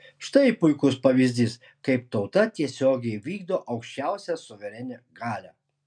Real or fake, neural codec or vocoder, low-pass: real; none; 9.9 kHz